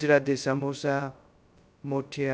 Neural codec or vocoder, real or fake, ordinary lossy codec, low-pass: codec, 16 kHz, 0.2 kbps, FocalCodec; fake; none; none